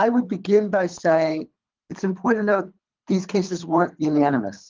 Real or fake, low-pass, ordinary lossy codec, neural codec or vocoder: fake; 7.2 kHz; Opus, 32 kbps; codec, 24 kHz, 3 kbps, HILCodec